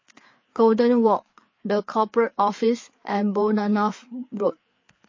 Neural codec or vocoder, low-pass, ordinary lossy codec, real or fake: codec, 16 kHz, 4 kbps, FreqCodec, larger model; 7.2 kHz; MP3, 32 kbps; fake